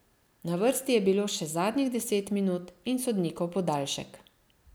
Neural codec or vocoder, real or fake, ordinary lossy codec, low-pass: none; real; none; none